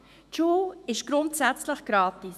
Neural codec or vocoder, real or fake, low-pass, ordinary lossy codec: autoencoder, 48 kHz, 128 numbers a frame, DAC-VAE, trained on Japanese speech; fake; 14.4 kHz; none